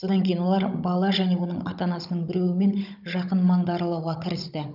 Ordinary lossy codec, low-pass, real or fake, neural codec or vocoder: none; 5.4 kHz; fake; codec, 16 kHz, 16 kbps, FunCodec, trained on Chinese and English, 50 frames a second